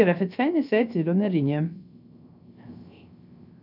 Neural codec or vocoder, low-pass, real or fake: codec, 16 kHz, 0.3 kbps, FocalCodec; 5.4 kHz; fake